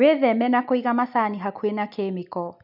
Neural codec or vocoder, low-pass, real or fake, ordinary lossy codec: none; 5.4 kHz; real; none